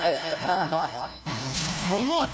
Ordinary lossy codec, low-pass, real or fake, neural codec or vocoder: none; none; fake; codec, 16 kHz, 1 kbps, FunCodec, trained on LibriTTS, 50 frames a second